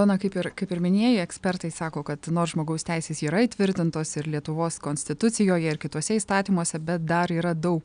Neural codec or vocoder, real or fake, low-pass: none; real; 9.9 kHz